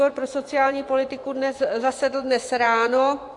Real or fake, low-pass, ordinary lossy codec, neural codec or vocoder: fake; 10.8 kHz; AAC, 64 kbps; vocoder, 24 kHz, 100 mel bands, Vocos